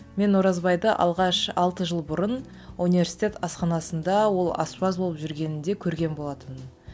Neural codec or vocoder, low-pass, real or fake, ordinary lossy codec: none; none; real; none